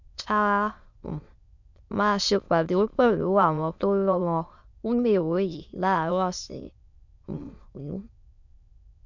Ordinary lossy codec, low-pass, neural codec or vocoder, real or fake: none; 7.2 kHz; autoencoder, 22.05 kHz, a latent of 192 numbers a frame, VITS, trained on many speakers; fake